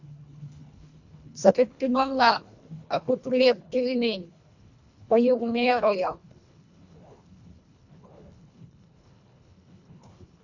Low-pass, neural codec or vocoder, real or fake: 7.2 kHz; codec, 24 kHz, 1.5 kbps, HILCodec; fake